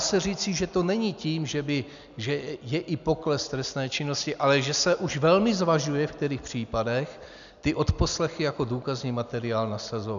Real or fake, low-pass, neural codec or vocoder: real; 7.2 kHz; none